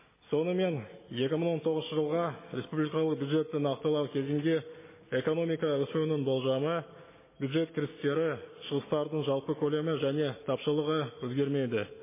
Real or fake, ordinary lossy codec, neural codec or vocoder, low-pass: real; MP3, 16 kbps; none; 3.6 kHz